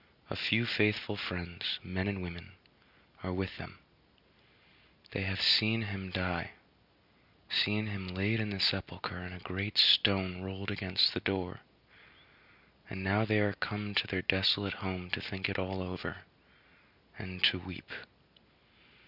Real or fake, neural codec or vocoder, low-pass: real; none; 5.4 kHz